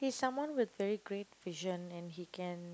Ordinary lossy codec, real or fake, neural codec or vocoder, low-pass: none; real; none; none